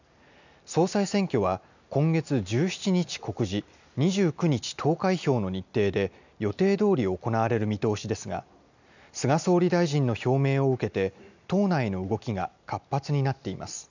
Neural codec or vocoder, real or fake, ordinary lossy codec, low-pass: none; real; none; 7.2 kHz